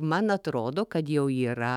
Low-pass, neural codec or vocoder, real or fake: 19.8 kHz; autoencoder, 48 kHz, 128 numbers a frame, DAC-VAE, trained on Japanese speech; fake